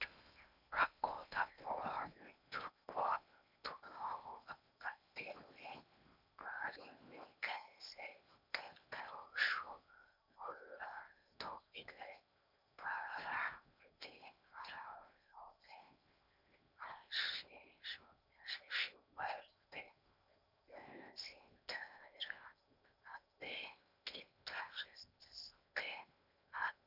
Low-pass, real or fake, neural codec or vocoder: 5.4 kHz; fake; codec, 16 kHz in and 24 kHz out, 0.8 kbps, FocalCodec, streaming, 65536 codes